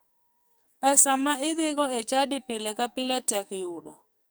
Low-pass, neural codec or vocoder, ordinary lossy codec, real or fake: none; codec, 44.1 kHz, 2.6 kbps, SNAC; none; fake